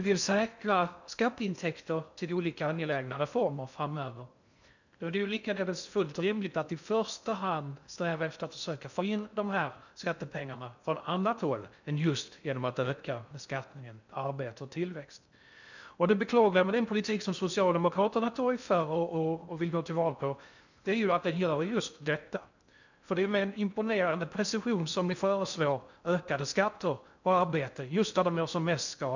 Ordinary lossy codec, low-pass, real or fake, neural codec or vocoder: none; 7.2 kHz; fake; codec, 16 kHz in and 24 kHz out, 0.6 kbps, FocalCodec, streaming, 2048 codes